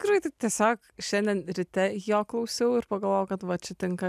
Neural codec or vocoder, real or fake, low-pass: none; real; 14.4 kHz